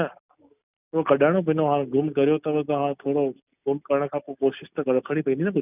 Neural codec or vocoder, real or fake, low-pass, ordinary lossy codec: none; real; 3.6 kHz; none